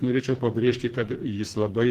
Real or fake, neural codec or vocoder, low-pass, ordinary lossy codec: fake; codec, 44.1 kHz, 2.6 kbps, SNAC; 14.4 kHz; Opus, 16 kbps